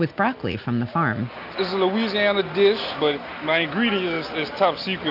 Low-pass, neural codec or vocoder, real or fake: 5.4 kHz; none; real